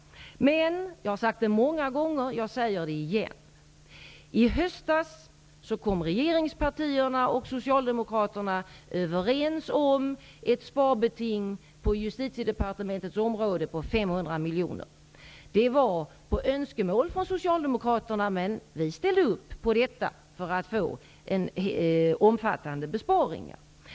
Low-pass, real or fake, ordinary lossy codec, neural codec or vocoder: none; real; none; none